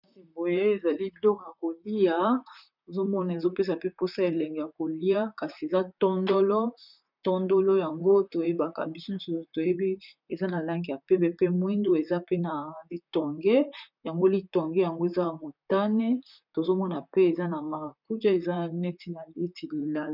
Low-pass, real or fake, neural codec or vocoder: 5.4 kHz; fake; vocoder, 44.1 kHz, 128 mel bands, Pupu-Vocoder